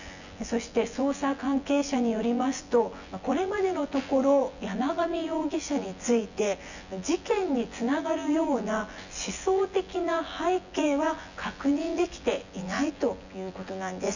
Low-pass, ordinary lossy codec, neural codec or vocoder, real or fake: 7.2 kHz; none; vocoder, 24 kHz, 100 mel bands, Vocos; fake